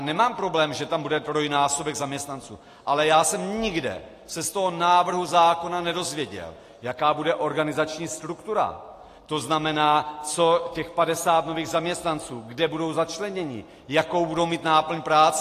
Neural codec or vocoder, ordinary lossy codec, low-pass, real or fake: none; AAC, 48 kbps; 14.4 kHz; real